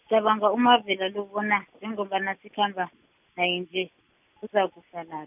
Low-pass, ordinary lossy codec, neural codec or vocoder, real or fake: 3.6 kHz; none; none; real